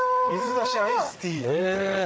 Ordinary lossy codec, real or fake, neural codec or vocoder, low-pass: none; fake; codec, 16 kHz, 8 kbps, FreqCodec, larger model; none